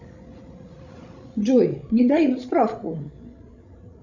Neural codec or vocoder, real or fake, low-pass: codec, 16 kHz, 16 kbps, FreqCodec, larger model; fake; 7.2 kHz